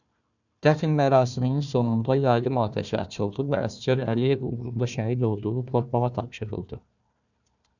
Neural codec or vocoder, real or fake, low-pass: codec, 16 kHz, 1 kbps, FunCodec, trained on Chinese and English, 50 frames a second; fake; 7.2 kHz